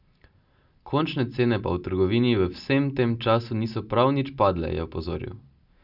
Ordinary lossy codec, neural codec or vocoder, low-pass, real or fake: none; none; 5.4 kHz; real